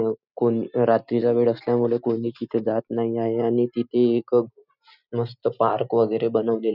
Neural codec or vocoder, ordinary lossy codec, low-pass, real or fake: none; none; 5.4 kHz; real